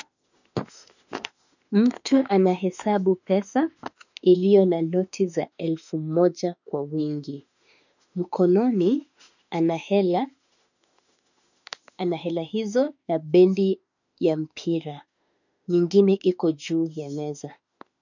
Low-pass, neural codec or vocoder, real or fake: 7.2 kHz; autoencoder, 48 kHz, 32 numbers a frame, DAC-VAE, trained on Japanese speech; fake